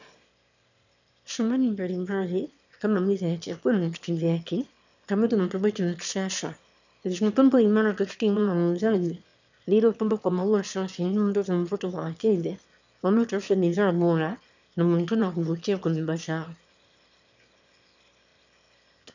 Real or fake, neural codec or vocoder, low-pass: fake; autoencoder, 22.05 kHz, a latent of 192 numbers a frame, VITS, trained on one speaker; 7.2 kHz